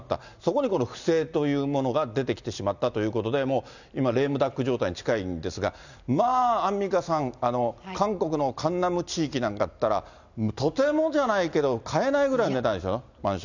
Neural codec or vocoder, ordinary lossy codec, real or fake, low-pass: none; none; real; 7.2 kHz